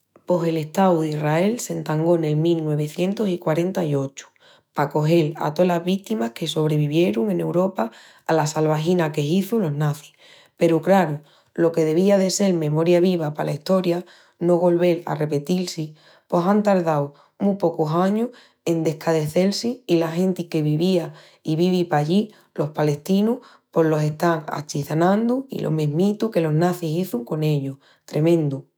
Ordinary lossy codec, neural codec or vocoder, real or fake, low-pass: none; autoencoder, 48 kHz, 128 numbers a frame, DAC-VAE, trained on Japanese speech; fake; none